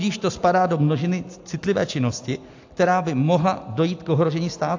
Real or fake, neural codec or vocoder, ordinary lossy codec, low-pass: real; none; AAC, 48 kbps; 7.2 kHz